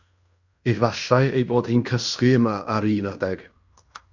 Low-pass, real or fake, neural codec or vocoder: 7.2 kHz; fake; codec, 16 kHz in and 24 kHz out, 0.9 kbps, LongCat-Audio-Codec, fine tuned four codebook decoder